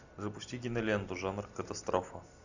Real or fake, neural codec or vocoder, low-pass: real; none; 7.2 kHz